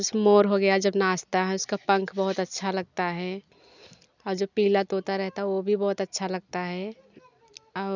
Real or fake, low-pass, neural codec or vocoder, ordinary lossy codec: real; 7.2 kHz; none; none